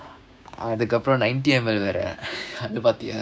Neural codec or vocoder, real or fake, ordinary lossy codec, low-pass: codec, 16 kHz, 6 kbps, DAC; fake; none; none